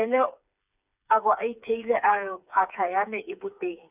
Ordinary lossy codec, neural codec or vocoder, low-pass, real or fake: none; codec, 16 kHz, 4 kbps, FreqCodec, smaller model; 3.6 kHz; fake